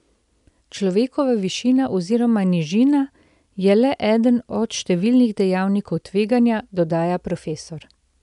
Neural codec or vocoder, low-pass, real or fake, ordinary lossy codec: none; 10.8 kHz; real; none